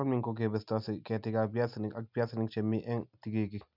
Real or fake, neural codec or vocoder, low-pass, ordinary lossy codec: real; none; 5.4 kHz; none